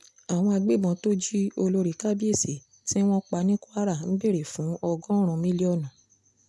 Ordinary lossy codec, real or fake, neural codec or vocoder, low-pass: none; real; none; none